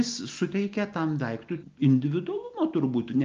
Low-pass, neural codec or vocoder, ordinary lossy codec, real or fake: 7.2 kHz; none; Opus, 32 kbps; real